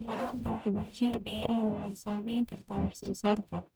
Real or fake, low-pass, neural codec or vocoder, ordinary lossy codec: fake; none; codec, 44.1 kHz, 0.9 kbps, DAC; none